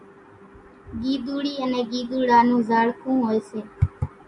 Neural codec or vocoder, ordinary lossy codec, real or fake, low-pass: none; AAC, 64 kbps; real; 10.8 kHz